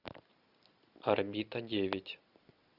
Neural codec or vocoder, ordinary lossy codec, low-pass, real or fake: none; Opus, 64 kbps; 5.4 kHz; real